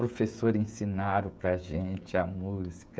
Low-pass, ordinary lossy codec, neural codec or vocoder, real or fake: none; none; codec, 16 kHz, 16 kbps, FreqCodec, smaller model; fake